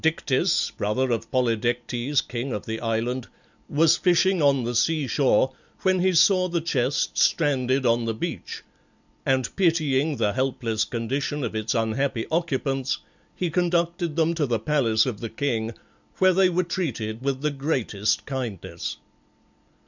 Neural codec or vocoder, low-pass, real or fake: none; 7.2 kHz; real